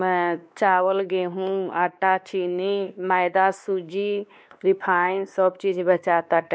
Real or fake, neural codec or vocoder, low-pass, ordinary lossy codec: fake; codec, 16 kHz, 2 kbps, X-Codec, WavLM features, trained on Multilingual LibriSpeech; none; none